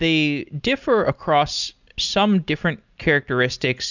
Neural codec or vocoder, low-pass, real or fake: none; 7.2 kHz; real